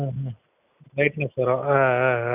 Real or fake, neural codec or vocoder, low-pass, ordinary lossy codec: real; none; 3.6 kHz; none